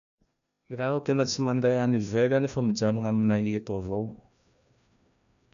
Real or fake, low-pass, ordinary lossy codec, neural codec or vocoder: fake; 7.2 kHz; none; codec, 16 kHz, 1 kbps, FreqCodec, larger model